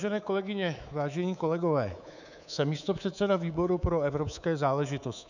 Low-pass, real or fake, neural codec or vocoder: 7.2 kHz; fake; codec, 24 kHz, 3.1 kbps, DualCodec